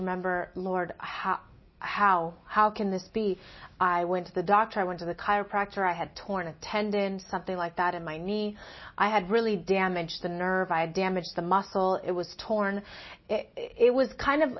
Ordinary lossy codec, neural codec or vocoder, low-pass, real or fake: MP3, 24 kbps; none; 7.2 kHz; real